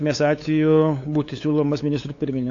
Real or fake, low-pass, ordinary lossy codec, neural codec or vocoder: fake; 7.2 kHz; AAC, 48 kbps; codec, 16 kHz, 8 kbps, FunCodec, trained on LibriTTS, 25 frames a second